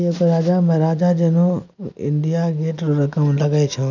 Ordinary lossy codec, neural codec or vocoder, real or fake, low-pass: none; none; real; 7.2 kHz